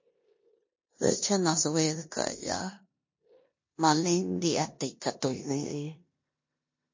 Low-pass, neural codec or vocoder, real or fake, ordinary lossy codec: 7.2 kHz; codec, 16 kHz in and 24 kHz out, 0.9 kbps, LongCat-Audio-Codec, four codebook decoder; fake; MP3, 32 kbps